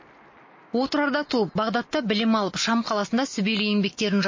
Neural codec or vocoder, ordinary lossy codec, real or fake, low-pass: none; MP3, 32 kbps; real; 7.2 kHz